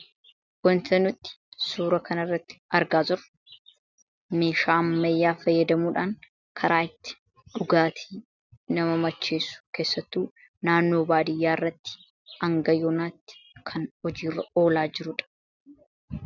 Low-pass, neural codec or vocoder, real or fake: 7.2 kHz; none; real